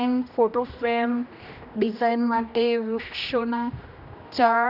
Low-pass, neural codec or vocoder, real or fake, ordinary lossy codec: 5.4 kHz; codec, 16 kHz, 1 kbps, X-Codec, HuBERT features, trained on general audio; fake; none